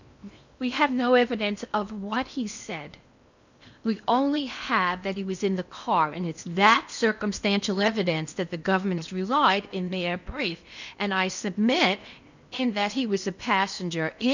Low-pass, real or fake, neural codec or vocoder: 7.2 kHz; fake; codec, 16 kHz in and 24 kHz out, 0.8 kbps, FocalCodec, streaming, 65536 codes